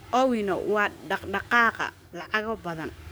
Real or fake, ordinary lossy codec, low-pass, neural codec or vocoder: fake; none; none; codec, 44.1 kHz, 7.8 kbps, DAC